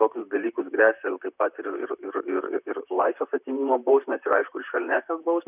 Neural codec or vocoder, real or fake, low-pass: vocoder, 44.1 kHz, 128 mel bands, Pupu-Vocoder; fake; 3.6 kHz